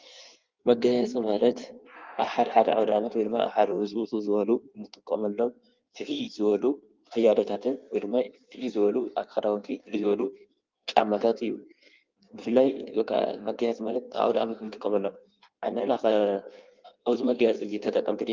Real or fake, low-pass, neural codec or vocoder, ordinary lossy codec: fake; 7.2 kHz; codec, 16 kHz in and 24 kHz out, 1.1 kbps, FireRedTTS-2 codec; Opus, 32 kbps